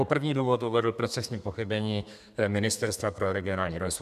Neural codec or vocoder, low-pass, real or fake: codec, 32 kHz, 1.9 kbps, SNAC; 14.4 kHz; fake